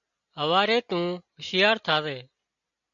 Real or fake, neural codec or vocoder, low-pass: real; none; 7.2 kHz